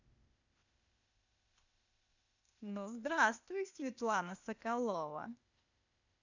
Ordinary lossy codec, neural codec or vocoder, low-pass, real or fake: none; codec, 16 kHz, 0.8 kbps, ZipCodec; 7.2 kHz; fake